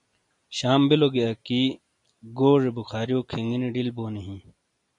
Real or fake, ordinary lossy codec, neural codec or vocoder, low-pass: real; MP3, 64 kbps; none; 10.8 kHz